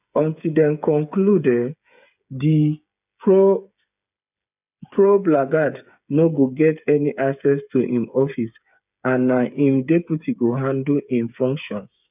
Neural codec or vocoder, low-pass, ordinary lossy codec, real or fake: codec, 16 kHz, 8 kbps, FreqCodec, smaller model; 3.6 kHz; none; fake